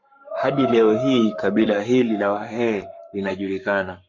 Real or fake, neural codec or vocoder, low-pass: fake; codec, 44.1 kHz, 7.8 kbps, Pupu-Codec; 7.2 kHz